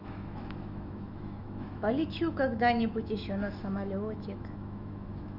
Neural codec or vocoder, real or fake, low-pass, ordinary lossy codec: autoencoder, 48 kHz, 128 numbers a frame, DAC-VAE, trained on Japanese speech; fake; 5.4 kHz; none